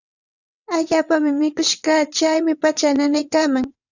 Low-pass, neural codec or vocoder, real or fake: 7.2 kHz; vocoder, 22.05 kHz, 80 mel bands, WaveNeXt; fake